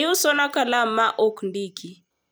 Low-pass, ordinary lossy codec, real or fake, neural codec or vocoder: none; none; fake; vocoder, 44.1 kHz, 128 mel bands every 256 samples, BigVGAN v2